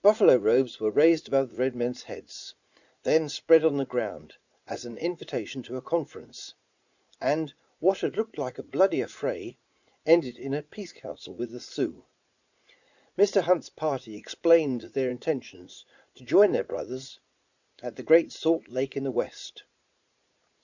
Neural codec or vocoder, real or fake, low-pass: vocoder, 44.1 kHz, 80 mel bands, Vocos; fake; 7.2 kHz